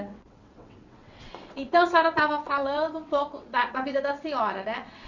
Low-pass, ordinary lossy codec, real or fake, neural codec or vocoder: 7.2 kHz; none; fake; vocoder, 22.05 kHz, 80 mel bands, WaveNeXt